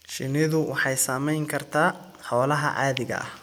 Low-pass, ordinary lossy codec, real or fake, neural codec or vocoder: none; none; real; none